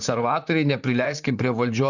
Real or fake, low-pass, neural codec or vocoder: fake; 7.2 kHz; vocoder, 44.1 kHz, 128 mel bands, Pupu-Vocoder